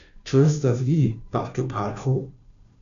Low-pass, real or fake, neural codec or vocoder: 7.2 kHz; fake; codec, 16 kHz, 0.5 kbps, FunCodec, trained on Chinese and English, 25 frames a second